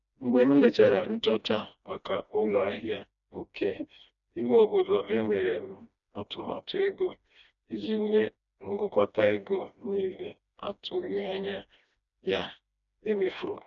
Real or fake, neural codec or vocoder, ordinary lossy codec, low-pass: fake; codec, 16 kHz, 1 kbps, FreqCodec, smaller model; none; 7.2 kHz